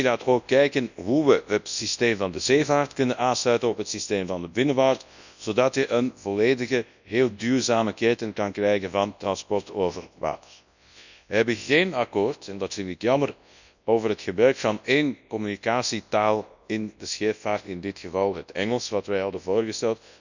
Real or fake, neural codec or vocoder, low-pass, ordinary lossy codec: fake; codec, 24 kHz, 0.9 kbps, WavTokenizer, large speech release; 7.2 kHz; none